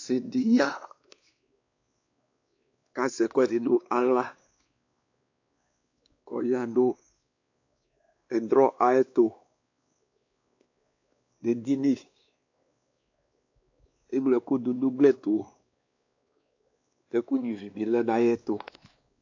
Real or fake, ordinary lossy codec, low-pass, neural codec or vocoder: fake; AAC, 48 kbps; 7.2 kHz; codec, 16 kHz, 2 kbps, X-Codec, WavLM features, trained on Multilingual LibriSpeech